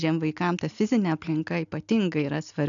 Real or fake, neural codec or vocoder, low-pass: real; none; 7.2 kHz